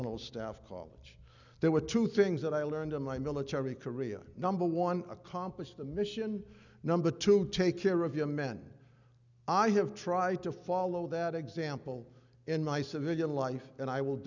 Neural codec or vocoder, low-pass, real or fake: none; 7.2 kHz; real